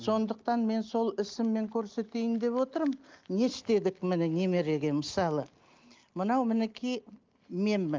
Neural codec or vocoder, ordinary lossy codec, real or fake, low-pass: none; Opus, 32 kbps; real; 7.2 kHz